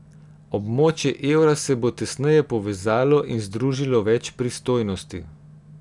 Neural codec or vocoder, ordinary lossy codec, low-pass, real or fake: none; none; 10.8 kHz; real